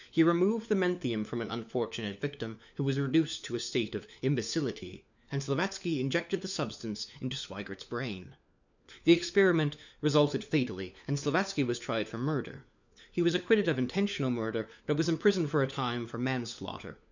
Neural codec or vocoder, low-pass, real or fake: codec, 16 kHz, 4 kbps, FunCodec, trained on LibriTTS, 50 frames a second; 7.2 kHz; fake